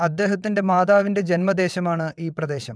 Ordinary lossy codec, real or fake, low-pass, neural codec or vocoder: none; fake; none; vocoder, 22.05 kHz, 80 mel bands, WaveNeXt